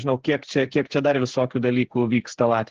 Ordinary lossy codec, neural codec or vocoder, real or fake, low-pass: Opus, 16 kbps; codec, 16 kHz, 8 kbps, FreqCodec, smaller model; fake; 7.2 kHz